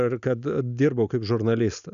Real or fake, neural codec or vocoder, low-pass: real; none; 7.2 kHz